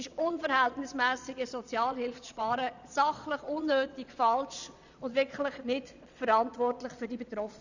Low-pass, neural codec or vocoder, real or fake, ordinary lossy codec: 7.2 kHz; vocoder, 22.05 kHz, 80 mel bands, Vocos; fake; none